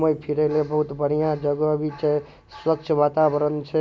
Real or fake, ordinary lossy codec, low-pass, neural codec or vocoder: real; none; none; none